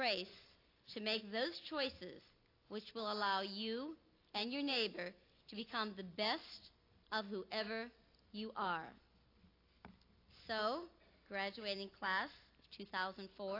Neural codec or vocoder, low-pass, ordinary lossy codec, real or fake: none; 5.4 kHz; AAC, 32 kbps; real